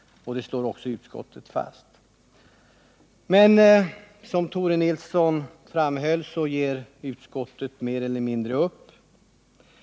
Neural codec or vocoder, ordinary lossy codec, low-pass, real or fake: none; none; none; real